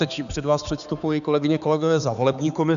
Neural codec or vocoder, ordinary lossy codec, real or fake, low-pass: codec, 16 kHz, 4 kbps, X-Codec, HuBERT features, trained on balanced general audio; MP3, 96 kbps; fake; 7.2 kHz